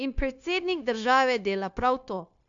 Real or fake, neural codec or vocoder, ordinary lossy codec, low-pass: fake; codec, 16 kHz, 0.9 kbps, LongCat-Audio-Codec; AAC, 64 kbps; 7.2 kHz